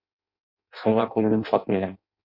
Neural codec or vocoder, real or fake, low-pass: codec, 16 kHz in and 24 kHz out, 0.6 kbps, FireRedTTS-2 codec; fake; 5.4 kHz